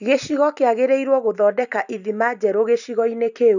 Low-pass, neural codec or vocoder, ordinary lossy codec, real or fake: 7.2 kHz; none; none; real